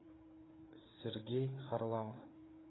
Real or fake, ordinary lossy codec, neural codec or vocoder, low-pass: fake; AAC, 16 kbps; codec, 16 kHz, 4 kbps, FreqCodec, larger model; 7.2 kHz